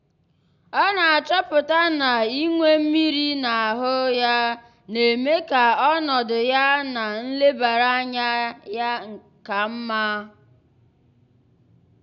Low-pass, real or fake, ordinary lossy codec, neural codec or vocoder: 7.2 kHz; real; none; none